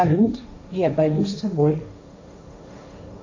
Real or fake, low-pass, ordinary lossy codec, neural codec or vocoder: fake; 7.2 kHz; AAC, 48 kbps; codec, 16 kHz, 1.1 kbps, Voila-Tokenizer